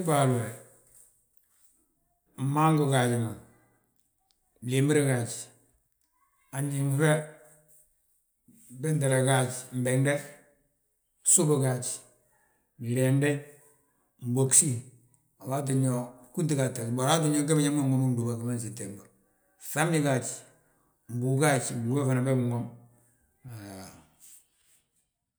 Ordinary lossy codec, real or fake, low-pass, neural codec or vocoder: none; real; none; none